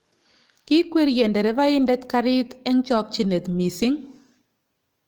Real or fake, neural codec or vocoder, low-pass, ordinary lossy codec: fake; codec, 44.1 kHz, 7.8 kbps, DAC; 19.8 kHz; Opus, 24 kbps